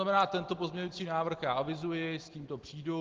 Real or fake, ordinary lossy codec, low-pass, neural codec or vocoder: real; Opus, 16 kbps; 7.2 kHz; none